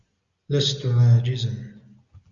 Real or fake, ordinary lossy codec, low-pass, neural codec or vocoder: real; Opus, 64 kbps; 7.2 kHz; none